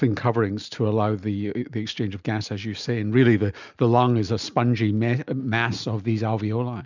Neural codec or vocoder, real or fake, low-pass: none; real; 7.2 kHz